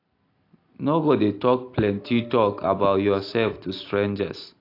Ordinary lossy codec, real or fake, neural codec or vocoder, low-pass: AAC, 32 kbps; real; none; 5.4 kHz